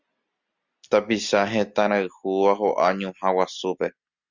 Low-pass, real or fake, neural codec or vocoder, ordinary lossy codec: 7.2 kHz; real; none; Opus, 64 kbps